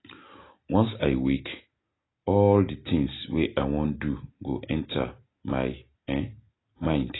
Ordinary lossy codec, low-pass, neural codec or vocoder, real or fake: AAC, 16 kbps; 7.2 kHz; none; real